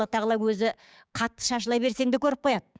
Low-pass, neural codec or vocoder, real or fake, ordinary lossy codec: none; codec, 16 kHz, 6 kbps, DAC; fake; none